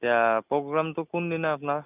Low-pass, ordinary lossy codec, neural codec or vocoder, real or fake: 3.6 kHz; none; none; real